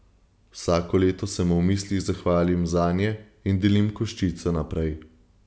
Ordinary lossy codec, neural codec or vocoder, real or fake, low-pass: none; none; real; none